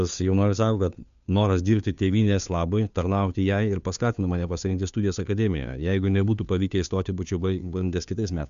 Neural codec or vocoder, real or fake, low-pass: codec, 16 kHz, 2 kbps, FunCodec, trained on Chinese and English, 25 frames a second; fake; 7.2 kHz